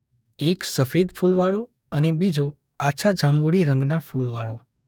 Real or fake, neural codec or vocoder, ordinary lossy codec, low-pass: fake; codec, 44.1 kHz, 2.6 kbps, DAC; none; 19.8 kHz